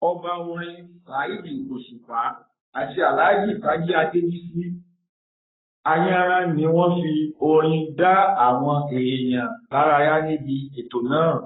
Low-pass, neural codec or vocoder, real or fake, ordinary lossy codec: 7.2 kHz; codec, 44.1 kHz, 7.8 kbps, Pupu-Codec; fake; AAC, 16 kbps